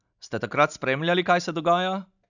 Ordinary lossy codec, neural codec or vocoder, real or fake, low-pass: none; none; real; 7.2 kHz